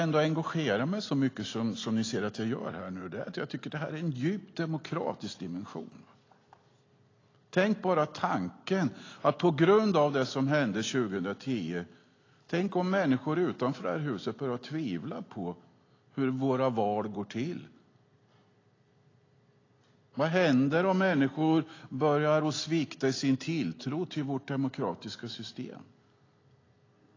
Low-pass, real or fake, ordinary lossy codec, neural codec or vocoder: 7.2 kHz; real; AAC, 32 kbps; none